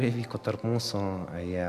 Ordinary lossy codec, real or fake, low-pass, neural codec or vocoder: Opus, 64 kbps; real; 14.4 kHz; none